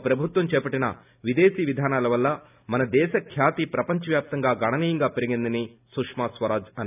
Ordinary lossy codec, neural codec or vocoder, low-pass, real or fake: none; none; 3.6 kHz; real